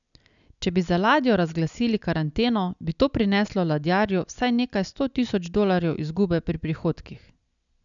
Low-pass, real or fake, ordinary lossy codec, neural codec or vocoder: 7.2 kHz; real; none; none